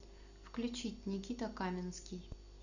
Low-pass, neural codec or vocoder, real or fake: 7.2 kHz; none; real